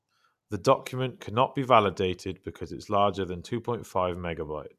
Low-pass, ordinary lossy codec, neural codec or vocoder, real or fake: 14.4 kHz; none; none; real